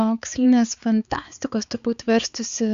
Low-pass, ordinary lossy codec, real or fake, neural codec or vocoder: 7.2 kHz; Opus, 64 kbps; fake; codec, 16 kHz, 4 kbps, X-Codec, HuBERT features, trained on balanced general audio